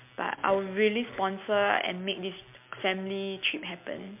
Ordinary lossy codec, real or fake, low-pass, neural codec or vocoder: MP3, 32 kbps; real; 3.6 kHz; none